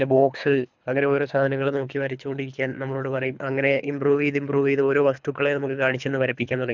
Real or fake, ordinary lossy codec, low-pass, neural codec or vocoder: fake; none; 7.2 kHz; codec, 24 kHz, 3 kbps, HILCodec